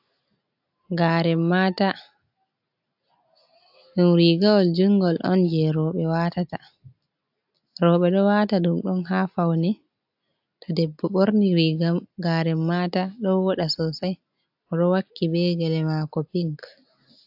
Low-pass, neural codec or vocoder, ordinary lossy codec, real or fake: 5.4 kHz; none; AAC, 48 kbps; real